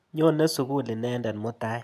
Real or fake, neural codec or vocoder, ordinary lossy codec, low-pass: real; none; none; 14.4 kHz